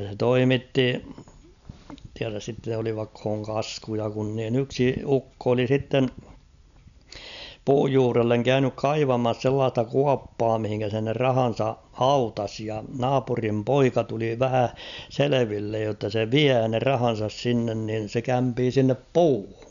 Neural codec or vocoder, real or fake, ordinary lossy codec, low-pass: none; real; none; 7.2 kHz